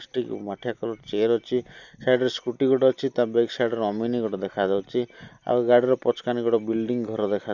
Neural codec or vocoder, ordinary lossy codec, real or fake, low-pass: none; Opus, 64 kbps; real; 7.2 kHz